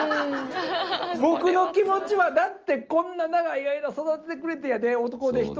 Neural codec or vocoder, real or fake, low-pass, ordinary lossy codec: none; real; 7.2 kHz; Opus, 24 kbps